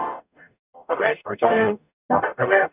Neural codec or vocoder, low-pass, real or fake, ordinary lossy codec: codec, 44.1 kHz, 0.9 kbps, DAC; 3.6 kHz; fake; none